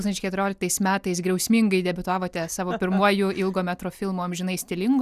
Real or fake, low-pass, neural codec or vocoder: real; 14.4 kHz; none